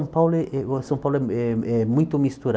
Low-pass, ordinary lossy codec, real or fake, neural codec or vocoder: none; none; real; none